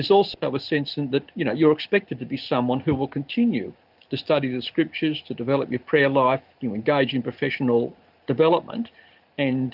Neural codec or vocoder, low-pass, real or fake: none; 5.4 kHz; real